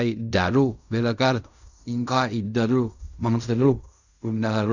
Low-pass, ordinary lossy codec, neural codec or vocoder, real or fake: 7.2 kHz; none; codec, 16 kHz in and 24 kHz out, 0.4 kbps, LongCat-Audio-Codec, fine tuned four codebook decoder; fake